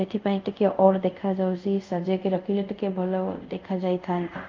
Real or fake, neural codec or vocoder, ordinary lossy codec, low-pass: fake; codec, 24 kHz, 0.5 kbps, DualCodec; Opus, 24 kbps; 7.2 kHz